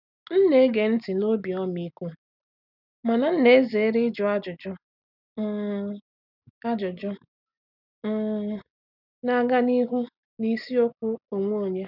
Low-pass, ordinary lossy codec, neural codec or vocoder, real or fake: 5.4 kHz; none; none; real